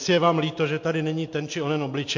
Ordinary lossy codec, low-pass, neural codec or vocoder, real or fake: MP3, 48 kbps; 7.2 kHz; none; real